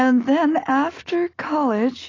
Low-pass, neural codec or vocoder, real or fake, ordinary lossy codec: 7.2 kHz; none; real; AAC, 32 kbps